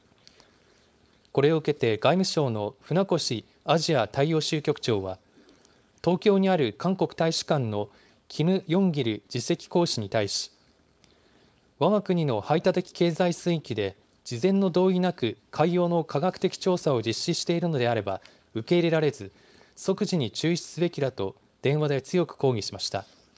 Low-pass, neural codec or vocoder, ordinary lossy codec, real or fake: none; codec, 16 kHz, 4.8 kbps, FACodec; none; fake